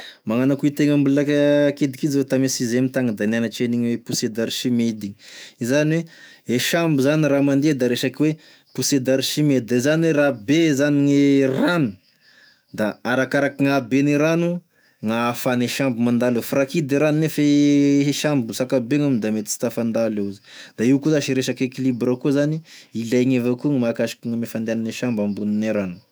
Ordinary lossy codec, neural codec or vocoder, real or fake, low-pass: none; autoencoder, 48 kHz, 128 numbers a frame, DAC-VAE, trained on Japanese speech; fake; none